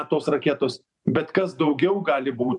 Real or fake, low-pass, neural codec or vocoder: fake; 10.8 kHz; vocoder, 44.1 kHz, 128 mel bands every 512 samples, BigVGAN v2